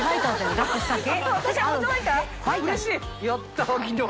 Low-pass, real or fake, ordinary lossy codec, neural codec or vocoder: none; real; none; none